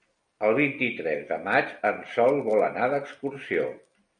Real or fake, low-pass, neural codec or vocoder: real; 9.9 kHz; none